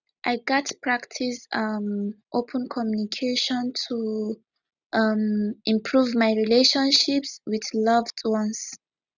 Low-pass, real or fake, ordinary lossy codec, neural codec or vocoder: 7.2 kHz; real; none; none